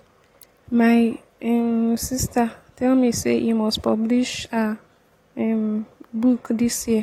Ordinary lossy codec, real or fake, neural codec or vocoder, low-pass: AAC, 48 kbps; real; none; 19.8 kHz